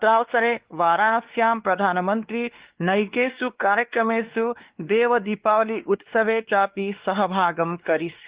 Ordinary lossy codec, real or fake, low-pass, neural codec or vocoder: Opus, 16 kbps; fake; 3.6 kHz; codec, 16 kHz, 2 kbps, X-Codec, WavLM features, trained on Multilingual LibriSpeech